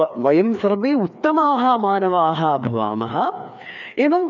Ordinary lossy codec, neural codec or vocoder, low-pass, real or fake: none; codec, 16 kHz, 2 kbps, FreqCodec, larger model; 7.2 kHz; fake